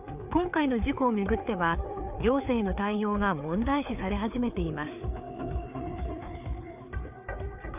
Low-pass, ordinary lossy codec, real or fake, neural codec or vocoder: 3.6 kHz; none; fake; codec, 16 kHz, 4 kbps, FreqCodec, larger model